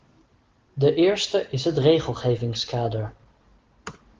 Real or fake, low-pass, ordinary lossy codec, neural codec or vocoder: real; 7.2 kHz; Opus, 16 kbps; none